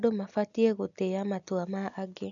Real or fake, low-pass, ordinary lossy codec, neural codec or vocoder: real; 7.2 kHz; none; none